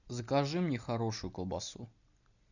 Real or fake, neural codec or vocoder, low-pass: real; none; 7.2 kHz